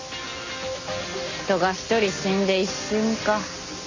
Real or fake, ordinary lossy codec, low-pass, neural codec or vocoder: real; MP3, 32 kbps; 7.2 kHz; none